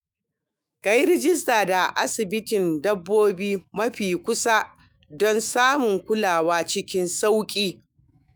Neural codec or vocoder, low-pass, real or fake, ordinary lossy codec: autoencoder, 48 kHz, 128 numbers a frame, DAC-VAE, trained on Japanese speech; none; fake; none